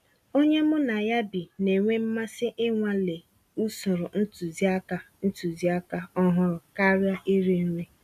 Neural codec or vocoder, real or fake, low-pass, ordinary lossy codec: none; real; 14.4 kHz; none